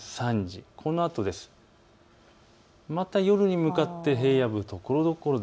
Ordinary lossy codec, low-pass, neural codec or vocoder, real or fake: none; none; none; real